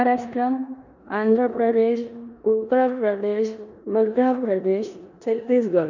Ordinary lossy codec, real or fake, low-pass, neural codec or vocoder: none; fake; 7.2 kHz; codec, 16 kHz in and 24 kHz out, 0.9 kbps, LongCat-Audio-Codec, four codebook decoder